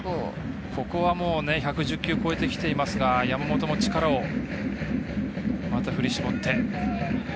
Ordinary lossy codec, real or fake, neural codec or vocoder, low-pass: none; real; none; none